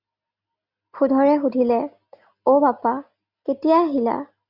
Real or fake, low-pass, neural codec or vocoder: real; 5.4 kHz; none